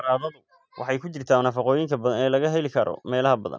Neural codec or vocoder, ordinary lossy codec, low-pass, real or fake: none; none; none; real